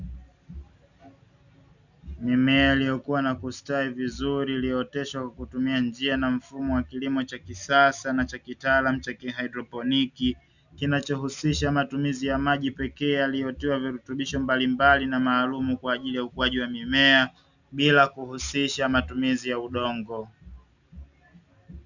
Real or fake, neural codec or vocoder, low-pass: real; none; 7.2 kHz